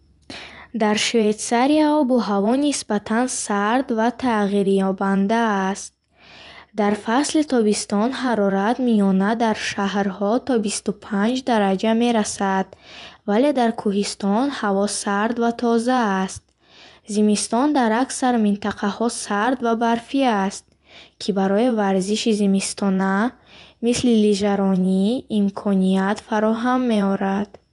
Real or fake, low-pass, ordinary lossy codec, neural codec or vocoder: fake; 10.8 kHz; none; vocoder, 24 kHz, 100 mel bands, Vocos